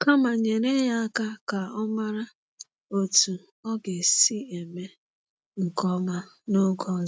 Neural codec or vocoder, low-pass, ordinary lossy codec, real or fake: none; none; none; real